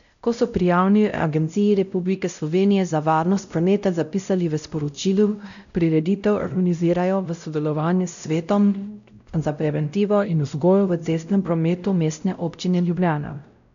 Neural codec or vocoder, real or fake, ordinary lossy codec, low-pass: codec, 16 kHz, 0.5 kbps, X-Codec, WavLM features, trained on Multilingual LibriSpeech; fake; none; 7.2 kHz